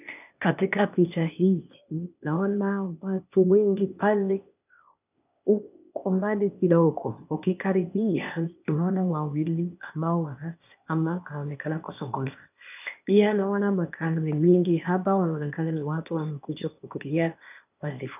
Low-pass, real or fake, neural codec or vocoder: 3.6 kHz; fake; codec, 16 kHz, 0.8 kbps, ZipCodec